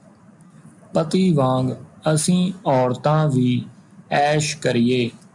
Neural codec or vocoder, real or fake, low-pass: none; real; 10.8 kHz